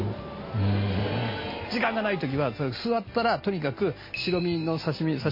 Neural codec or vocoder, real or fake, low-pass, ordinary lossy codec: none; real; 5.4 kHz; MP3, 24 kbps